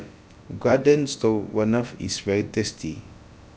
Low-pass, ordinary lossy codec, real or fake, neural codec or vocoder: none; none; fake; codec, 16 kHz, about 1 kbps, DyCAST, with the encoder's durations